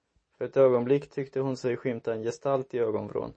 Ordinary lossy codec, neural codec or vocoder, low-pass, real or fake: MP3, 32 kbps; none; 9.9 kHz; real